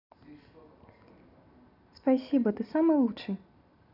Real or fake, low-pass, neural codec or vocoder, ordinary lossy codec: real; 5.4 kHz; none; none